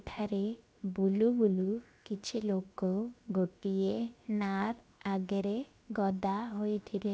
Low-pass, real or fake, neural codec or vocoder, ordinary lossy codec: none; fake; codec, 16 kHz, 0.7 kbps, FocalCodec; none